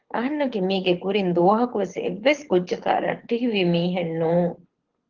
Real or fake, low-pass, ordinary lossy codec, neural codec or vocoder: fake; 7.2 kHz; Opus, 16 kbps; codec, 24 kHz, 6 kbps, HILCodec